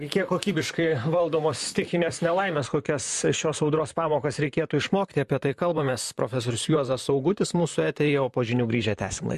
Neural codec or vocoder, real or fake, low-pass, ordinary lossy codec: vocoder, 44.1 kHz, 128 mel bands, Pupu-Vocoder; fake; 14.4 kHz; MP3, 64 kbps